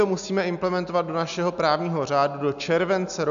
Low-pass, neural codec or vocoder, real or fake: 7.2 kHz; none; real